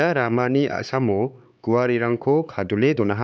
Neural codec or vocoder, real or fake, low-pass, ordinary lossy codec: codec, 16 kHz, 4 kbps, X-Codec, HuBERT features, trained on LibriSpeech; fake; none; none